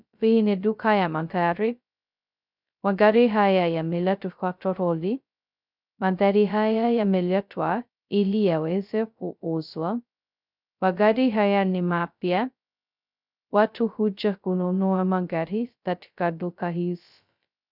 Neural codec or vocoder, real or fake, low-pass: codec, 16 kHz, 0.2 kbps, FocalCodec; fake; 5.4 kHz